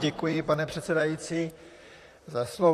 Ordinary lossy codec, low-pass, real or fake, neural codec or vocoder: AAC, 64 kbps; 14.4 kHz; fake; vocoder, 44.1 kHz, 128 mel bands, Pupu-Vocoder